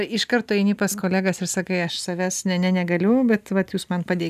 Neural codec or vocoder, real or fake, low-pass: none; real; 14.4 kHz